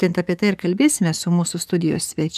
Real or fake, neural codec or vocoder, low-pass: fake; codec, 44.1 kHz, 7.8 kbps, DAC; 14.4 kHz